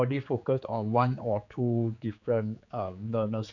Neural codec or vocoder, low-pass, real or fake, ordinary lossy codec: codec, 16 kHz, 4 kbps, X-Codec, HuBERT features, trained on general audio; 7.2 kHz; fake; none